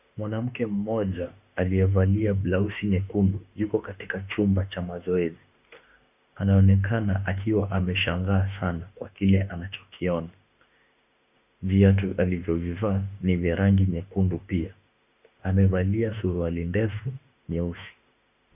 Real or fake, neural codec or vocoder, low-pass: fake; autoencoder, 48 kHz, 32 numbers a frame, DAC-VAE, trained on Japanese speech; 3.6 kHz